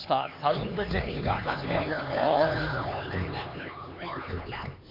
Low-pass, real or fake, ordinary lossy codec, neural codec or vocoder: 5.4 kHz; fake; MP3, 48 kbps; codec, 16 kHz, 4 kbps, X-Codec, HuBERT features, trained on LibriSpeech